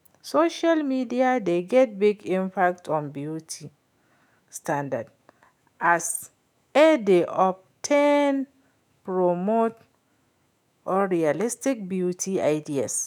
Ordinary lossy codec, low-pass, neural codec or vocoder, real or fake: none; 19.8 kHz; autoencoder, 48 kHz, 128 numbers a frame, DAC-VAE, trained on Japanese speech; fake